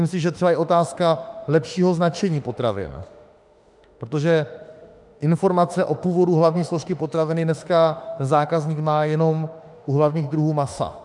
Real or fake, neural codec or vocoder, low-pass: fake; autoencoder, 48 kHz, 32 numbers a frame, DAC-VAE, trained on Japanese speech; 10.8 kHz